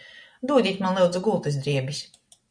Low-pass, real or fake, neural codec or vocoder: 9.9 kHz; real; none